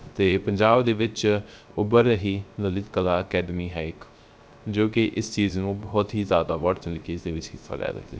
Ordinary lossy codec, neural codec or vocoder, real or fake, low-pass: none; codec, 16 kHz, 0.3 kbps, FocalCodec; fake; none